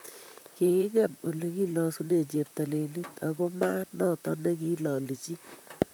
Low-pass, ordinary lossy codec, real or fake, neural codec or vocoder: none; none; fake; vocoder, 44.1 kHz, 128 mel bands, Pupu-Vocoder